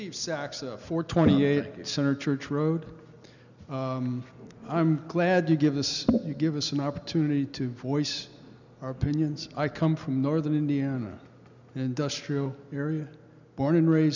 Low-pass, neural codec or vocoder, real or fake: 7.2 kHz; none; real